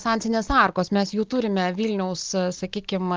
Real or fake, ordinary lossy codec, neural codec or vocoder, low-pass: real; Opus, 24 kbps; none; 7.2 kHz